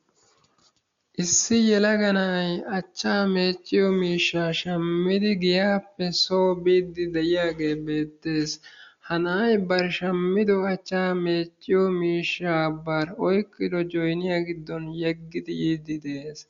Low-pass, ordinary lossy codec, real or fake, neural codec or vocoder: 7.2 kHz; Opus, 64 kbps; real; none